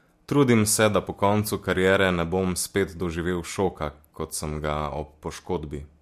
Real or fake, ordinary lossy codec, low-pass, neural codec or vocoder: real; MP3, 64 kbps; 14.4 kHz; none